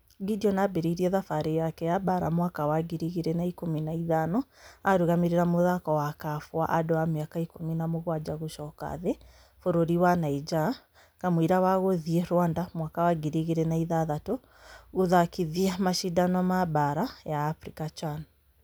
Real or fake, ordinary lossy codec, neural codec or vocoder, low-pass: real; none; none; none